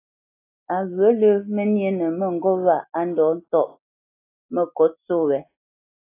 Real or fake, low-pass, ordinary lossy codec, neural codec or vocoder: real; 3.6 kHz; AAC, 24 kbps; none